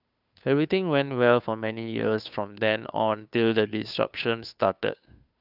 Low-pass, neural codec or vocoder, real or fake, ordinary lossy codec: 5.4 kHz; codec, 16 kHz, 2 kbps, FunCodec, trained on Chinese and English, 25 frames a second; fake; none